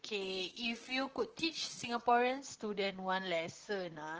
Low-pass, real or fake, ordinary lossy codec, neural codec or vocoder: 7.2 kHz; fake; Opus, 16 kbps; vocoder, 44.1 kHz, 128 mel bands, Pupu-Vocoder